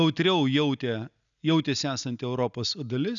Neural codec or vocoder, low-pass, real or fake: none; 7.2 kHz; real